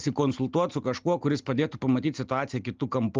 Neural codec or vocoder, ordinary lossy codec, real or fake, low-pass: none; Opus, 32 kbps; real; 7.2 kHz